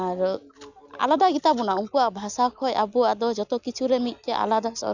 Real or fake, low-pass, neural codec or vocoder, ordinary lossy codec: real; 7.2 kHz; none; none